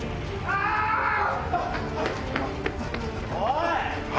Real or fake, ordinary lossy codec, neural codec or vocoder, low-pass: real; none; none; none